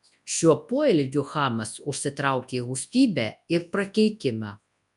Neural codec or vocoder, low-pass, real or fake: codec, 24 kHz, 0.9 kbps, WavTokenizer, large speech release; 10.8 kHz; fake